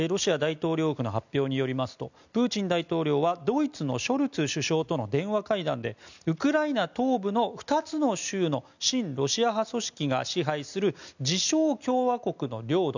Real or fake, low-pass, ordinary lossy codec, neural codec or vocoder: real; 7.2 kHz; none; none